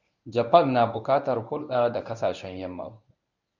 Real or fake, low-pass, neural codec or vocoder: fake; 7.2 kHz; codec, 24 kHz, 0.9 kbps, WavTokenizer, medium speech release version 1